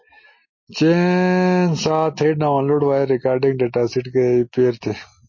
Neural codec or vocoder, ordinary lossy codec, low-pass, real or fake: none; MP3, 32 kbps; 7.2 kHz; real